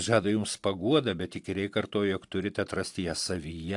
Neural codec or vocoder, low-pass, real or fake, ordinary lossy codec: none; 10.8 kHz; real; AAC, 64 kbps